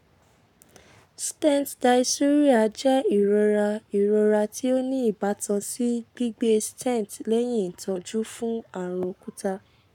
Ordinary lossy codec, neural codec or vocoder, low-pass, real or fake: none; codec, 44.1 kHz, 7.8 kbps, Pupu-Codec; 19.8 kHz; fake